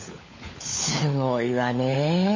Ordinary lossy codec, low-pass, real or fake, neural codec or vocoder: MP3, 32 kbps; 7.2 kHz; fake; codec, 16 kHz, 16 kbps, FunCodec, trained on LibriTTS, 50 frames a second